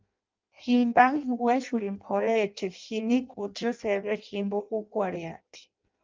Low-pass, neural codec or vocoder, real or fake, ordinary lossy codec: 7.2 kHz; codec, 16 kHz in and 24 kHz out, 0.6 kbps, FireRedTTS-2 codec; fake; Opus, 24 kbps